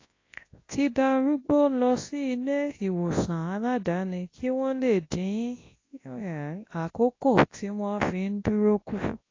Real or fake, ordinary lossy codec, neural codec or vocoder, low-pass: fake; AAC, 32 kbps; codec, 24 kHz, 0.9 kbps, WavTokenizer, large speech release; 7.2 kHz